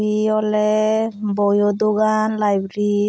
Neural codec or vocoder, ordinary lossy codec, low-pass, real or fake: none; none; none; real